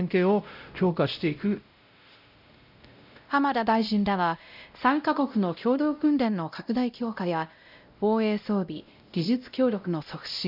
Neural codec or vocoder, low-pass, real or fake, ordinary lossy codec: codec, 16 kHz, 0.5 kbps, X-Codec, WavLM features, trained on Multilingual LibriSpeech; 5.4 kHz; fake; none